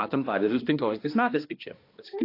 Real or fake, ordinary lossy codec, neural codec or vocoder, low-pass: fake; AAC, 32 kbps; codec, 16 kHz, 1 kbps, X-Codec, HuBERT features, trained on balanced general audio; 5.4 kHz